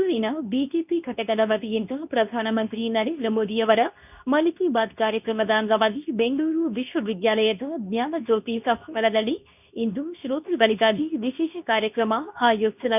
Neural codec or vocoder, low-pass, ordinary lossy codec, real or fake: codec, 24 kHz, 0.9 kbps, WavTokenizer, medium speech release version 2; 3.6 kHz; none; fake